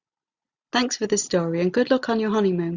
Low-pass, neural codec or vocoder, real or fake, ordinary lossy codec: 7.2 kHz; none; real; Opus, 64 kbps